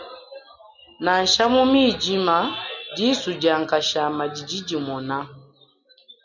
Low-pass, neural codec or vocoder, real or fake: 7.2 kHz; none; real